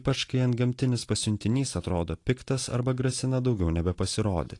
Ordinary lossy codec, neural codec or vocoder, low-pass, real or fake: AAC, 48 kbps; none; 10.8 kHz; real